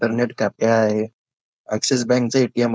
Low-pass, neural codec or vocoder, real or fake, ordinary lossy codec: none; codec, 16 kHz, 4.8 kbps, FACodec; fake; none